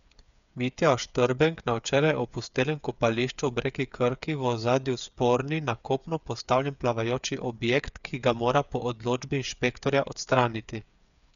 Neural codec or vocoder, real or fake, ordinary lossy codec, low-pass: codec, 16 kHz, 8 kbps, FreqCodec, smaller model; fake; none; 7.2 kHz